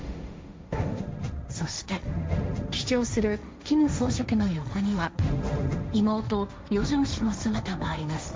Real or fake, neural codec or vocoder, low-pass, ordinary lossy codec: fake; codec, 16 kHz, 1.1 kbps, Voila-Tokenizer; none; none